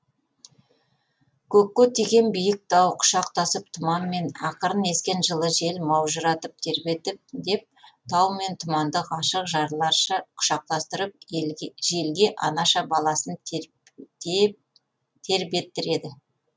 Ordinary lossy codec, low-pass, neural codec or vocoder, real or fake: none; none; none; real